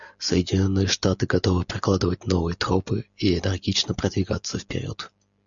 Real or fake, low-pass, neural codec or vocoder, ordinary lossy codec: real; 7.2 kHz; none; MP3, 96 kbps